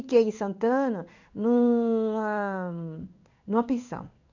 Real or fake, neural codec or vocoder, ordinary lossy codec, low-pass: fake; codec, 16 kHz in and 24 kHz out, 1 kbps, XY-Tokenizer; none; 7.2 kHz